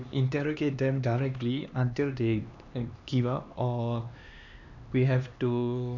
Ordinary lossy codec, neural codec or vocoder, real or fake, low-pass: none; codec, 16 kHz, 2 kbps, X-Codec, HuBERT features, trained on LibriSpeech; fake; 7.2 kHz